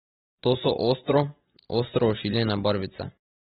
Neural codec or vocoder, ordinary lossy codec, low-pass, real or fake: none; AAC, 16 kbps; 19.8 kHz; real